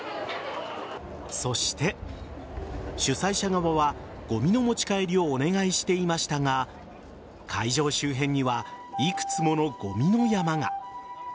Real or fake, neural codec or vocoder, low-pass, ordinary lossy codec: real; none; none; none